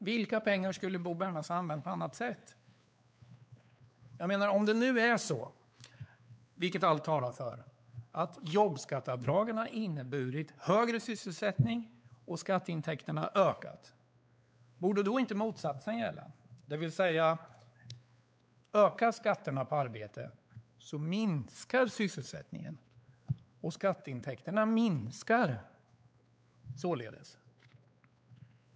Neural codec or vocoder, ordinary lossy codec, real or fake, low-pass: codec, 16 kHz, 4 kbps, X-Codec, WavLM features, trained on Multilingual LibriSpeech; none; fake; none